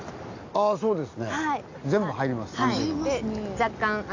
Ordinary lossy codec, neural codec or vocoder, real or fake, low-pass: AAC, 48 kbps; none; real; 7.2 kHz